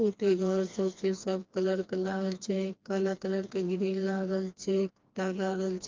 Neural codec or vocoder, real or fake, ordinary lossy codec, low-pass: codec, 16 kHz, 2 kbps, FreqCodec, smaller model; fake; Opus, 24 kbps; 7.2 kHz